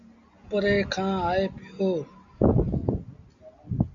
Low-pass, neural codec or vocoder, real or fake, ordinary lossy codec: 7.2 kHz; none; real; MP3, 64 kbps